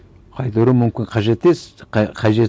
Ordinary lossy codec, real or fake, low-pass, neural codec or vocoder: none; real; none; none